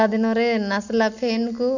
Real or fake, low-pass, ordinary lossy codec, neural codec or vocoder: real; 7.2 kHz; none; none